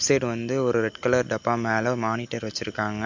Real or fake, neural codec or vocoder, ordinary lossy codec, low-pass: real; none; MP3, 48 kbps; 7.2 kHz